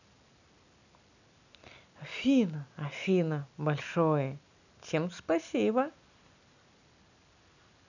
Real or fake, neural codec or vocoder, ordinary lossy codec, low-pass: real; none; none; 7.2 kHz